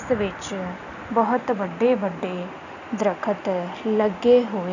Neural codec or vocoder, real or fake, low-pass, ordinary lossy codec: none; real; 7.2 kHz; none